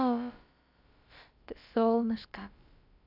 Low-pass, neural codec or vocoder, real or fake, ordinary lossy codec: 5.4 kHz; codec, 16 kHz, about 1 kbps, DyCAST, with the encoder's durations; fake; none